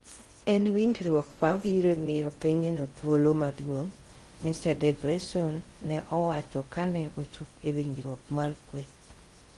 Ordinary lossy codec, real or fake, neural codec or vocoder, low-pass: Opus, 24 kbps; fake; codec, 16 kHz in and 24 kHz out, 0.6 kbps, FocalCodec, streaming, 4096 codes; 10.8 kHz